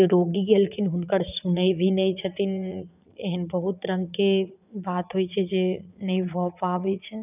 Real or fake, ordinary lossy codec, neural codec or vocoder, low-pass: fake; none; vocoder, 44.1 kHz, 80 mel bands, Vocos; 3.6 kHz